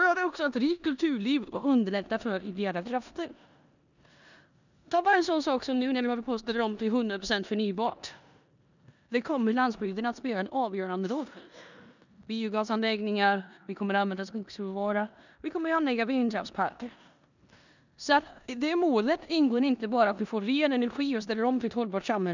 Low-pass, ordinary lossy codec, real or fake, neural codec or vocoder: 7.2 kHz; none; fake; codec, 16 kHz in and 24 kHz out, 0.9 kbps, LongCat-Audio-Codec, four codebook decoder